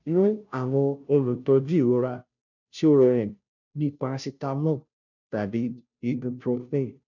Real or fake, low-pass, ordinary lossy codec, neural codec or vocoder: fake; 7.2 kHz; none; codec, 16 kHz, 0.5 kbps, FunCodec, trained on Chinese and English, 25 frames a second